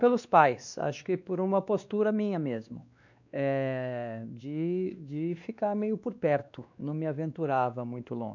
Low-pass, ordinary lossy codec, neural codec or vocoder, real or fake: 7.2 kHz; none; codec, 16 kHz, 2 kbps, X-Codec, WavLM features, trained on Multilingual LibriSpeech; fake